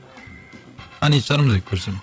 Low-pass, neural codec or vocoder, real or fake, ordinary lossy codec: none; codec, 16 kHz, 8 kbps, FreqCodec, larger model; fake; none